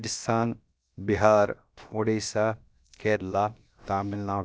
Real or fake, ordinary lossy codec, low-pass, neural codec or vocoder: fake; none; none; codec, 16 kHz, about 1 kbps, DyCAST, with the encoder's durations